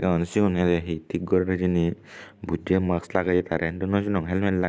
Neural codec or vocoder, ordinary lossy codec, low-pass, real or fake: none; none; none; real